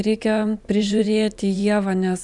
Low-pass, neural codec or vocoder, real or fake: 10.8 kHz; vocoder, 44.1 kHz, 128 mel bands every 512 samples, BigVGAN v2; fake